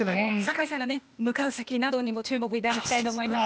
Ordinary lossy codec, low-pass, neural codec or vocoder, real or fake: none; none; codec, 16 kHz, 0.8 kbps, ZipCodec; fake